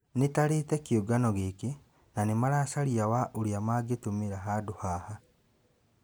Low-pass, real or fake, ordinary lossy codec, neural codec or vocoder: none; real; none; none